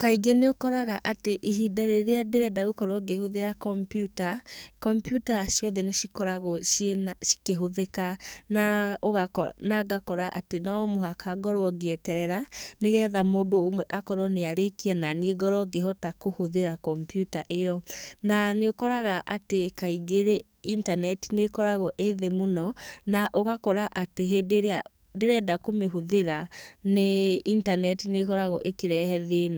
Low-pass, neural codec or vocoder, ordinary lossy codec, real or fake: none; codec, 44.1 kHz, 2.6 kbps, SNAC; none; fake